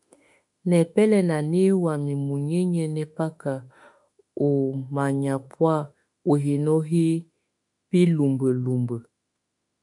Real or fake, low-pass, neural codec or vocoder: fake; 10.8 kHz; autoencoder, 48 kHz, 32 numbers a frame, DAC-VAE, trained on Japanese speech